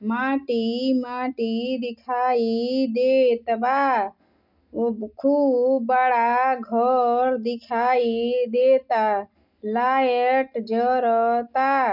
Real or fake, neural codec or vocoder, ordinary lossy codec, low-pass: real; none; none; 5.4 kHz